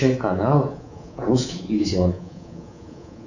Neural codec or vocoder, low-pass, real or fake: codec, 24 kHz, 3.1 kbps, DualCodec; 7.2 kHz; fake